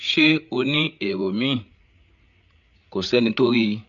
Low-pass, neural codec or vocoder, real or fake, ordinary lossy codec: 7.2 kHz; codec, 16 kHz, 8 kbps, FreqCodec, larger model; fake; none